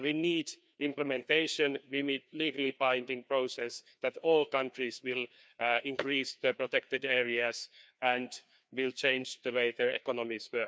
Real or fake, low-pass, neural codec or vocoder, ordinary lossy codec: fake; none; codec, 16 kHz, 2 kbps, FreqCodec, larger model; none